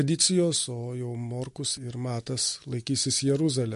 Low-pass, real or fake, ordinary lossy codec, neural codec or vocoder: 14.4 kHz; real; MP3, 48 kbps; none